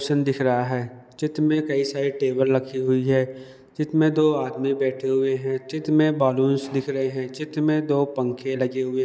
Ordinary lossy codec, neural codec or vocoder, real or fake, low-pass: none; none; real; none